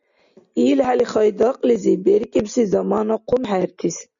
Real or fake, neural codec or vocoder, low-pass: real; none; 7.2 kHz